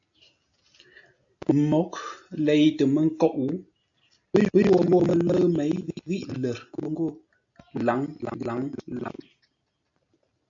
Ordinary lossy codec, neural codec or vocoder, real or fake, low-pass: AAC, 64 kbps; none; real; 7.2 kHz